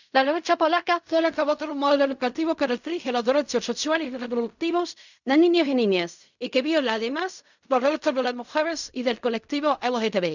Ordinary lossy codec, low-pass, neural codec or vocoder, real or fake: none; 7.2 kHz; codec, 16 kHz in and 24 kHz out, 0.4 kbps, LongCat-Audio-Codec, fine tuned four codebook decoder; fake